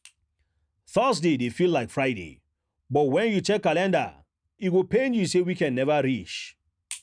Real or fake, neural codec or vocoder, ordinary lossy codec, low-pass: real; none; none; 9.9 kHz